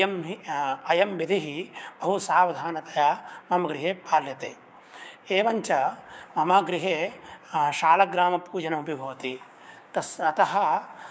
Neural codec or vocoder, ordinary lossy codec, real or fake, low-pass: codec, 16 kHz, 6 kbps, DAC; none; fake; none